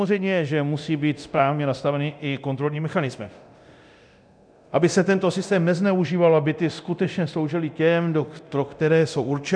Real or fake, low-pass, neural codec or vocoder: fake; 9.9 kHz; codec, 24 kHz, 0.9 kbps, DualCodec